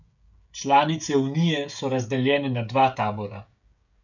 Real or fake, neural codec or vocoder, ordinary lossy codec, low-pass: fake; codec, 16 kHz, 16 kbps, FreqCodec, smaller model; none; 7.2 kHz